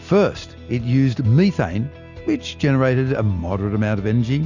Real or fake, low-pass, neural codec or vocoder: real; 7.2 kHz; none